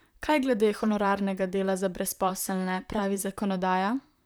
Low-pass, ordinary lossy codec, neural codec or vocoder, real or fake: none; none; vocoder, 44.1 kHz, 128 mel bands, Pupu-Vocoder; fake